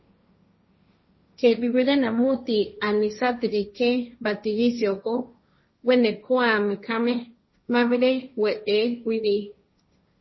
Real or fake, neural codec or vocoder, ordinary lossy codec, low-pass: fake; codec, 16 kHz, 1.1 kbps, Voila-Tokenizer; MP3, 24 kbps; 7.2 kHz